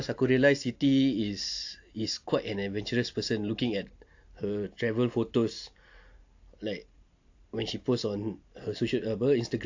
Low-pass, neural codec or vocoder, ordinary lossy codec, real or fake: 7.2 kHz; none; AAC, 48 kbps; real